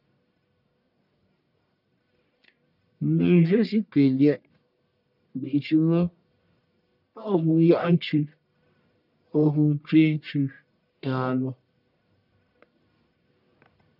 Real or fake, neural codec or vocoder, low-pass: fake; codec, 44.1 kHz, 1.7 kbps, Pupu-Codec; 5.4 kHz